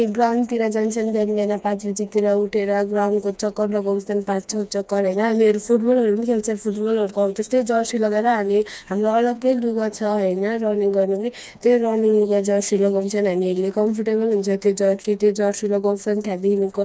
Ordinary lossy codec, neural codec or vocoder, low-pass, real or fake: none; codec, 16 kHz, 2 kbps, FreqCodec, smaller model; none; fake